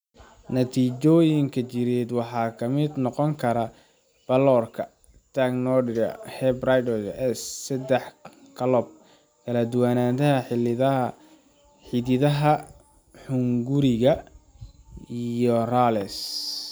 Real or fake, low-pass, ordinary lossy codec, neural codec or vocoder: real; none; none; none